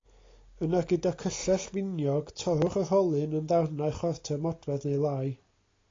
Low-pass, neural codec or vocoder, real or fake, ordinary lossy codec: 7.2 kHz; none; real; AAC, 32 kbps